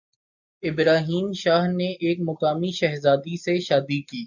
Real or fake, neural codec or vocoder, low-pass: real; none; 7.2 kHz